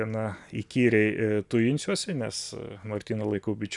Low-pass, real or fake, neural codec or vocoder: 10.8 kHz; real; none